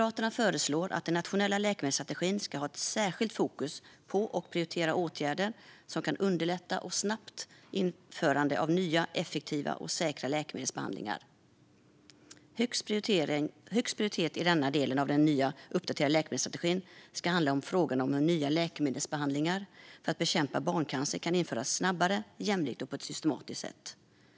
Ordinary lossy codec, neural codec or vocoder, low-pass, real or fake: none; none; none; real